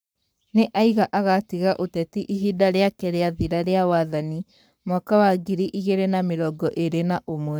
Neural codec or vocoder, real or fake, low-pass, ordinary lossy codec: codec, 44.1 kHz, 7.8 kbps, Pupu-Codec; fake; none; none